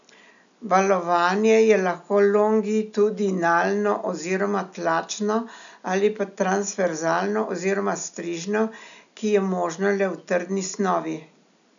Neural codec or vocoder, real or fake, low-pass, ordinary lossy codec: none; real; 7.2 kHz; none